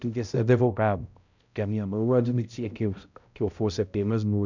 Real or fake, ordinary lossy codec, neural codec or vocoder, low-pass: fake; none; codec, 16 kHz, 0.5 kbps, X-Codec, HuBERT features, trained on balanced general audio; 7.2 kHz